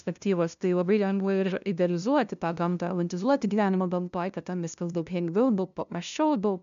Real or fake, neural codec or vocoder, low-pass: fake; codec, 16 kHz, 0.5 kbps, FunCodec, trained on LibriTTS, 25 frames a second; 7.2 kHz